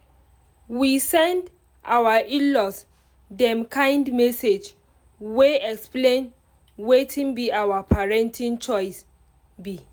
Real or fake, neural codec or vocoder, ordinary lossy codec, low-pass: real; none; none; none